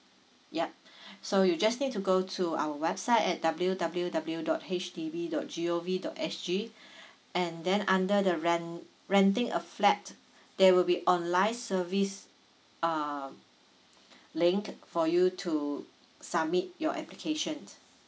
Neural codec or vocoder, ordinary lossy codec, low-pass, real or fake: none; none; none; real